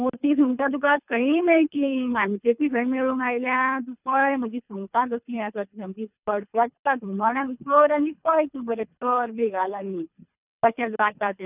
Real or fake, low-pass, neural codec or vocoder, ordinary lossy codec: fake; 3.6 kHz; codec, 24 kHz, 3 kbps, HILCodec; none